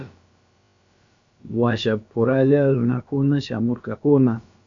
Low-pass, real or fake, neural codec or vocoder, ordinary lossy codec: 7.2 kHz; fake; codec, 16 kHz, about 1 kbps, DyCAST, with the encoder's durations; MP3, 64 kbps